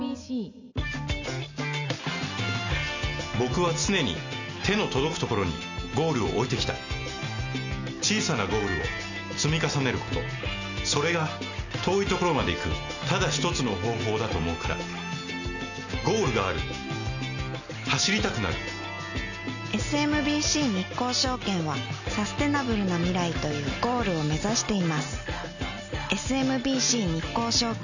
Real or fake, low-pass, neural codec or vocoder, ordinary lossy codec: real; 7.2 kHz; none; none